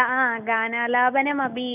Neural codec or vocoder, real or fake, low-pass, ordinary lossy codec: none; real; 3.6 kHz; none